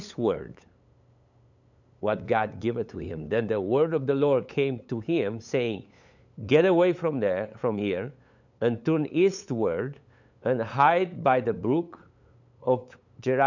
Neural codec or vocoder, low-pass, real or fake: codec, 16 kHz, 8 kbps, FunCodec, trained on LibriTTS, 25 frames a second; 7.2 kHz; fake